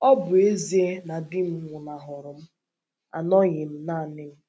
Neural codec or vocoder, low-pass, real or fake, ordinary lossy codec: none; none; real; none